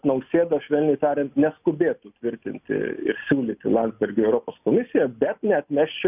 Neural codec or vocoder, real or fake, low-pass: none; real; 3.6 kHz